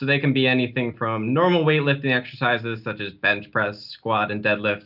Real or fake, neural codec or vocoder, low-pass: real; none; 5.4 kHz